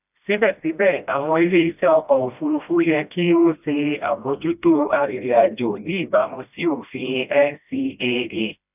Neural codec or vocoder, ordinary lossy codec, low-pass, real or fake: codec, 16 kHz, 1 kbps, FreqCodec, smaller model; none; 3.6 kHz; fake